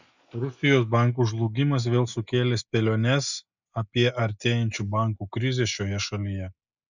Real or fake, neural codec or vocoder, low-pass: real; none; 7.2 kHz